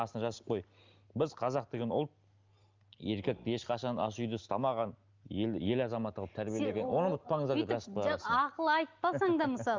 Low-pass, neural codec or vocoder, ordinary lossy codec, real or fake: 7.2 kHz; none; Opus, 24 kbps; real